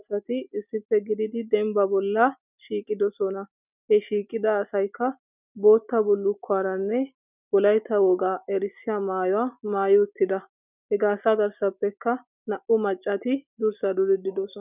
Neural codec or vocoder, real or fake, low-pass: none; real; 3.6 kHz